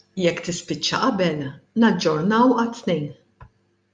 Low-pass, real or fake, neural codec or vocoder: 9.9 kHz; real; none